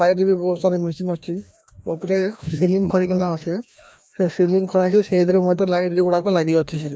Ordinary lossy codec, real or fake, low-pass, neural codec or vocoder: none; fake; none; codec, 16 kHz, 1 kbps, FreqCodec, larger model